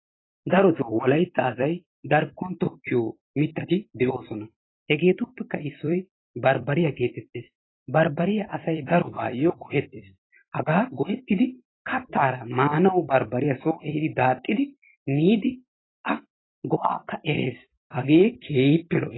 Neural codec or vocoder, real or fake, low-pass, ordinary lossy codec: vocoder, 44.1 kHz, 128 mel bands every 256 samples, BigVGAN v2; fake; 7.2 kHz; AAC, 16 kbps